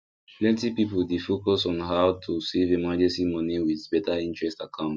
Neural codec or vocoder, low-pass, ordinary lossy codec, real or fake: none; none; none; real